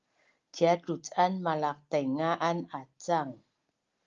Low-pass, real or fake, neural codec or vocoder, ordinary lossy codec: 7.2 kHz; real; none; Opus, 32 kbps